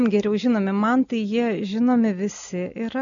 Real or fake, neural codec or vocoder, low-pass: real; none; 7.2 kHz